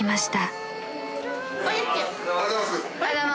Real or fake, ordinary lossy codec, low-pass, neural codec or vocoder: real; none; none; none